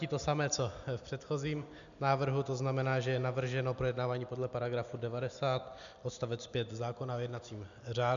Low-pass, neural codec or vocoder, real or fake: 7.2 kHz; none; real